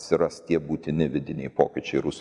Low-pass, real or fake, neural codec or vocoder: 10.8 kHz; real; none